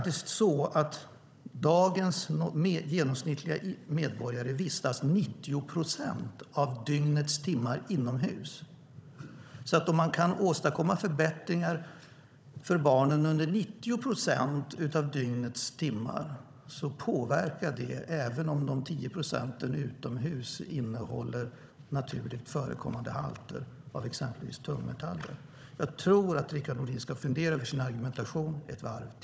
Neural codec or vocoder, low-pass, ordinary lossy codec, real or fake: codec, 16 kHz, 16 kbps, FunCodec, trained on Chinese and English, 50 frames a second; none; none; fake